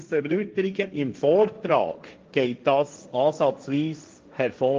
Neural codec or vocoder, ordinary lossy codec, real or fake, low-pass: codec, 16 kHz, 1.1 kbps, Voila-Tokenizer; Opus, 24 kbps; fake; 7.2 kHz